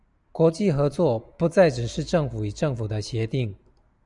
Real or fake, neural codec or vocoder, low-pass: real; none; 10.8 kHz